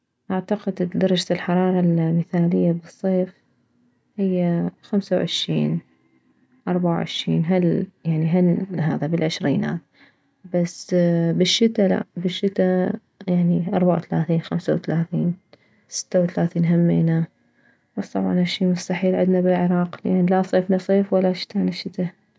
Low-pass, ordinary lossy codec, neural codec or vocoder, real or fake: none; none; none; real